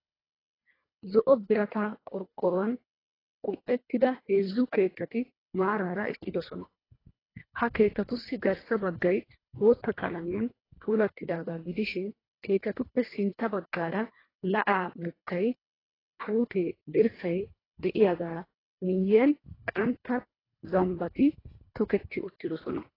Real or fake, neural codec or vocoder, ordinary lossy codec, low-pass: fake; codec, 24 kHz, 1.5 kbps, HILCodec; AAC, 24 kbps; 5.4 kHz